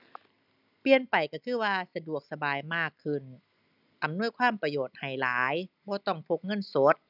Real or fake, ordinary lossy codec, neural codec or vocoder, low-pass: real; none; none; 5.4 kHz